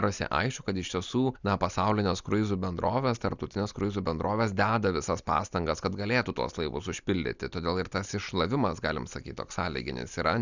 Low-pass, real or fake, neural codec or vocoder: 7.2 kHz; real; none